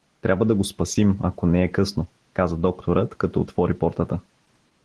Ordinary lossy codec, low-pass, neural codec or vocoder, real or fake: Opus, 16 kbps; 10.8 kHz; none; real